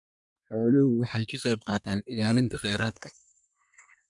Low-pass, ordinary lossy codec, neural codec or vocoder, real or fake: 10.8 kHz; MP3, 96 kbps; codec, 24 kHz, 1 kbps, SNAC; fake